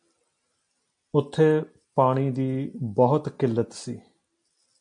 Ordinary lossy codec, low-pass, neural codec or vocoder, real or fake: MP3, 64 kbps; 9.9 kHz; none; real